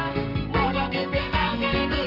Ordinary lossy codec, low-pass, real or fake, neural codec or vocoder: Opus, 24 kbps; 5.4 kHz; fake; codec, 44.1 kHz, 2.6 kbps, SNAC